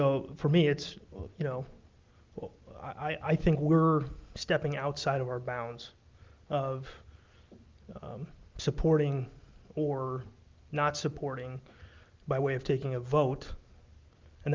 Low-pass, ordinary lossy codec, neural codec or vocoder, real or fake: 7.2 kHz; Opus, 32 kbps; none; real